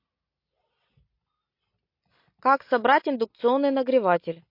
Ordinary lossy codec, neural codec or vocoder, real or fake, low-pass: MP3, 32 kbps; none; real; 5.4 kHz